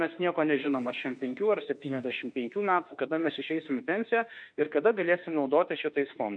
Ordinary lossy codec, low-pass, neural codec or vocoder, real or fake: MP3, 96 kbps; 9.9 kHz; autoencoder, 48 kHz, 32 numbers a frame, DAC-VAE, trained on Japanese speech; fake